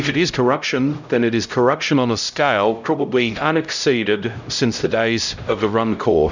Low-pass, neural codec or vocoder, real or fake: 7.2 kHz; codec, 16 kHz, 0.5 kbps, X-Codec, HuBERT features, trained on LibriSpeech; fake